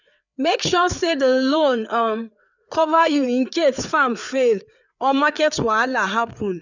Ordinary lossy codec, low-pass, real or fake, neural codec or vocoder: none; 7.2 kHz; fake; codec, 16 kHz, 4 kbps, FreqCodec, larger model